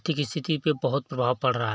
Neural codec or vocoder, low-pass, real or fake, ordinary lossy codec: none; none; real; none